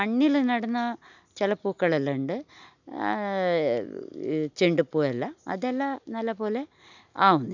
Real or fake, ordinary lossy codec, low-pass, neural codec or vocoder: real; none; 7.2 kHz; none